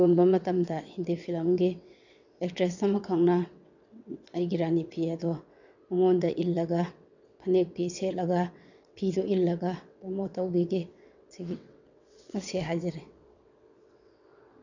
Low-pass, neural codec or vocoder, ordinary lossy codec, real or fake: 7.2 kHz; vocoder, 22.05 kHz, 80 mel bands, WaveNeXt; none; fake